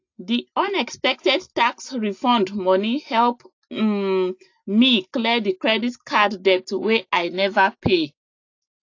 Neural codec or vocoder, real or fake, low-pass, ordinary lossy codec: none; real; 7.2 kHz; AAC, 48 kbps